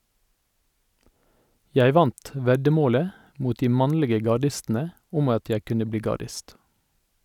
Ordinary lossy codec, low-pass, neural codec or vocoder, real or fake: none; 19.8 kHz; none; real